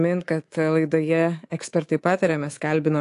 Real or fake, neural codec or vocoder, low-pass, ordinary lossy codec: fake; codec, 24 kHz, 3.1 kbps, DualCodec; 10.8 kHz; AAC, 48 kbps